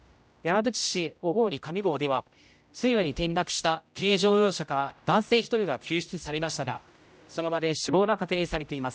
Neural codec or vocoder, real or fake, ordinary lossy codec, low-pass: codec, 16 kHz, 0.5 kbps, X-Codec, HuBERT features, trained on general audio; fake; none; none